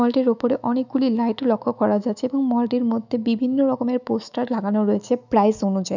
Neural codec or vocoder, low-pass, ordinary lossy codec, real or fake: autoencoder, 48 kHz, 128 numbers a frame, DAC-VAE, trained on Japanese speech; 7.2 kHz; none; fake